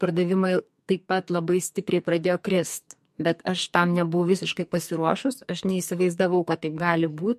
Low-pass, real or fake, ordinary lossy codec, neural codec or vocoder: 14.4 kHz; fake; MP3, 64 kbps; codec, 44.1 kHz, 2.6 kbps, SNAC